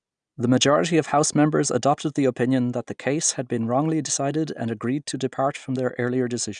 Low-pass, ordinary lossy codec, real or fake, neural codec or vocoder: 9.9 kHz; none; real; none